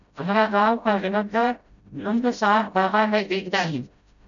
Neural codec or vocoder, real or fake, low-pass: codec, 16 kHz, 0.5 kbps, FreqCodec, smaller model; fake; 7.2 kHz